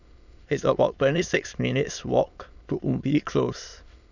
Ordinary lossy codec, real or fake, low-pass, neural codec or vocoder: none; fake; 7.2 kHz; autoencoder, 22.05 kHz, a latent of 192 numbers a frame, VITS, trained on many speakers